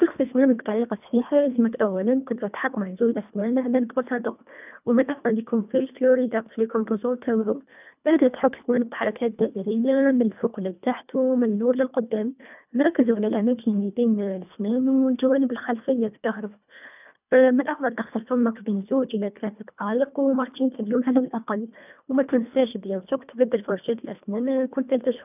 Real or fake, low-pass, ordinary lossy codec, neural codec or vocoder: fake; 3.6 kHz; none; codec, 24 kHz, 1.5 kbps, HILCodec